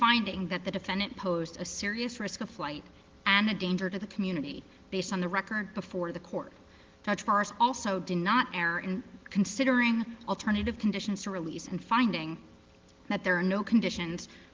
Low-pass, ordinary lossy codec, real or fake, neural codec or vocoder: 7.2 kHz; Opus, 16 kbps; real; none